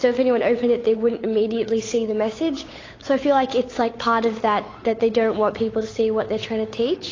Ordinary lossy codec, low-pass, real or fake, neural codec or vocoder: AAC, 32 kbps; 7.2 kHz; fake; codec, 16 kHz, 8 kbps, FunCodec, trained on Chinese and English, 25 frames a second